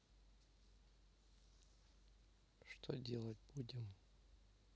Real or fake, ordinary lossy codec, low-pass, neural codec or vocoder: real; none; none; none